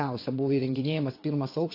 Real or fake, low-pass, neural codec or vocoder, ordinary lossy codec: fake; 5.4 kHz; vocoder, 22.05 kHz, 80 mel bands, WaveNeXt; AAC, 32 kbps